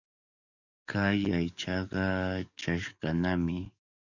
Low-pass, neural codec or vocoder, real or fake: 7.2 kHz; codec, 44.1 kHz, 7.8 kbps, DAC; fake